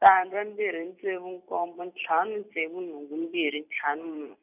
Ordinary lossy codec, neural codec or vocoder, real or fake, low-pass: none; vocoder, 44.1 kHz, 128 mel bands every 256 samples, BigVGAN v2; fake; 3.6 kHz